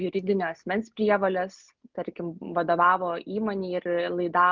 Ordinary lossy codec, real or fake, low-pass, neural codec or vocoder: Opus, 24 kbps; real; 7.2 kHz; none